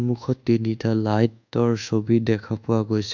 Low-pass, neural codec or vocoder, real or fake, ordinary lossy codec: 7.2 kHz; codec, 24 kHz, 1.2 kbps, DualCodec; fake; none